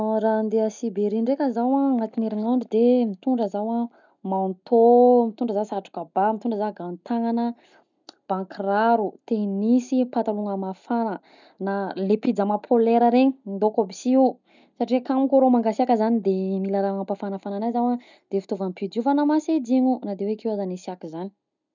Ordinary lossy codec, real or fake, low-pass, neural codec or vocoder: none; real; 7.2 kHz; none